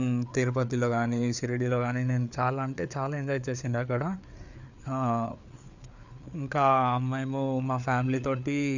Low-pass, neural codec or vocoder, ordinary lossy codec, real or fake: 7.2 kHz; codec, 16 kHz, 4 kbps, FreqCodec, larger model; none; fake